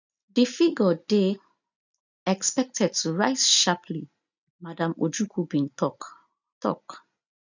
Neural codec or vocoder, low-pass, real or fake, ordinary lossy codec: none; 7.2 kHz; real; none